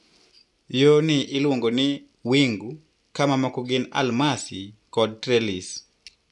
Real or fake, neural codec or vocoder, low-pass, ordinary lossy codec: real; none; 10.8 kHz; none